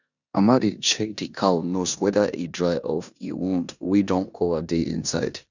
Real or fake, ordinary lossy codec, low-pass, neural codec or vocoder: fake; none; 7.2 kHz; codec, 16 kHz in and 24 kHz out, 0.9 kbps, LongCat-Audio-Codec, four codebook decoder